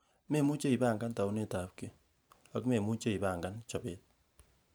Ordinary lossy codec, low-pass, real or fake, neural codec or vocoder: none; none; fake; vocoder, 44.1 kHz, 128 mel bands every 512 samples, BigVGAN v2